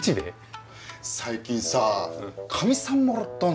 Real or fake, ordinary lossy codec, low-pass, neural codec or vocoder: real; none; none; none